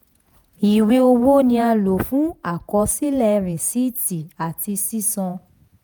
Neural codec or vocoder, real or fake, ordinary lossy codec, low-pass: vocoder, 48 kHz, 128 mel bands, Vocos; fake; none; none